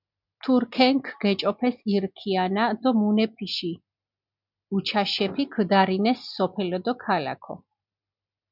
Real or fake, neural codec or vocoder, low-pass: real; none; 5.4 kHz